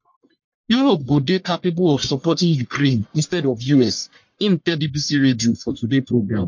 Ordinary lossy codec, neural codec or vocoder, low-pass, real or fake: MP3, 48 kbps; codec, 44.1 kHz, 1.7 kbps, Pupu-Codec; 7.2 kHz; fake